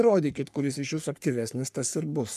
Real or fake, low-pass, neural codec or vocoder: fake; 14.4 kHz; codec, 44.1 kHz, 3.4 kbps, Pupu-Codec